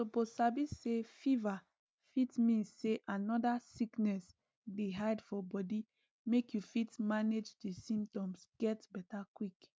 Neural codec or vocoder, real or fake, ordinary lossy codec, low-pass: none; real; none; none